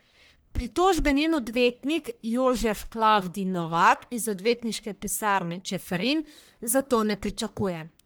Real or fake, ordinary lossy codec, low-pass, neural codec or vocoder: fake; none; none; codec, 44.1 kHz, 1.7 kbps, Pupu-Codec